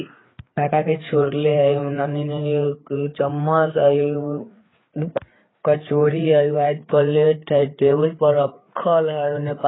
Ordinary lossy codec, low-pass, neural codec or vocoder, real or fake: AAC, 16 kbps; 7.2 kHz; codec, 16 kHz, 4 kbps, FreqCodec, larger model; fake